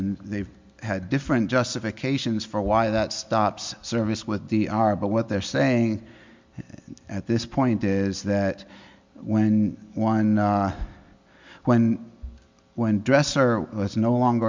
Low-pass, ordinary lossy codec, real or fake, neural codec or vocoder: 7.2 kHz; MP3, 64 kbps; real; none